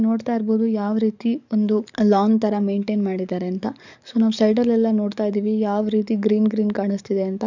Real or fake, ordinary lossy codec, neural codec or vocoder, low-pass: fake; none; codec, 44.1 kHz, 7.8 kbps, DAC; 7.2 kHz